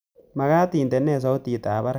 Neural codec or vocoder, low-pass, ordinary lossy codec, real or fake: none; none; none; real